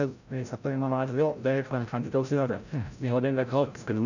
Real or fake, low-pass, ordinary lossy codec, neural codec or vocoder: fake; 7.2 kHz; none; codec, 16 kHz, 0.5 kbps, FreqCodec, larger model